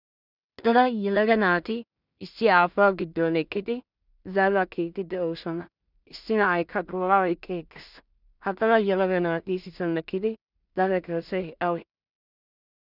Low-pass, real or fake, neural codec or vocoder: 5.4 kHz; fake; codec, 16 kHz in and 24 kHz out, 0.4 kbps, LongCat-Audio-Codec, two codebook decoder